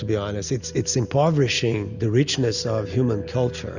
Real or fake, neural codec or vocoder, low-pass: real; none; 7.2 kHz